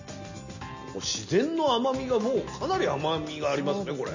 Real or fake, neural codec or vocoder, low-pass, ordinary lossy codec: real; none; 7.2 kHz; MP3, 32 kbps